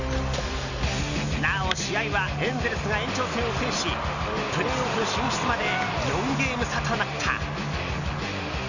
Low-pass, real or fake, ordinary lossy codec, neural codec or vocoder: 7.2 kHz; real; none; none